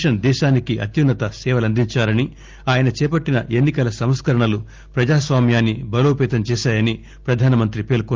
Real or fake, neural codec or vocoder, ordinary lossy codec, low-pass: real; none; Opus, 24 kbps; 7.2 kHz